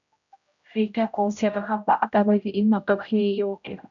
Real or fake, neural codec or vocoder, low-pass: fake; codec, 16 kHz, 0.5 kbps, X-Codec, HuBERT features, trained on general audio; 7.2 kHz